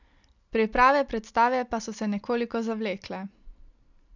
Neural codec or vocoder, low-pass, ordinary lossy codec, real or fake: none; 7.2 kHz; none; real